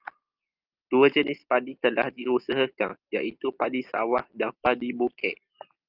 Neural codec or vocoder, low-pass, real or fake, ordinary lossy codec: none; 5.4 kHz; real; Opus, 24 kbps